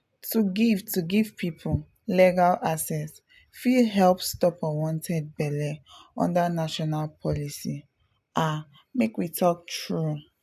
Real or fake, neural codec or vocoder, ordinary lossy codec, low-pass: real; none; AAC, 96 kbps; 14.4 kHz